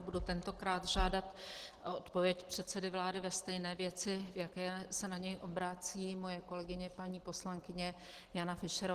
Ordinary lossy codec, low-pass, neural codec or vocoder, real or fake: Opus, 16 kbps; 14.4 kHz; none; real